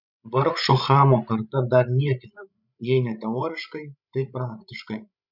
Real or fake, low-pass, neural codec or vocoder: fake; 5.4 kHz; codec, 16 kHz, 16 kbps, FreqCodec, larger model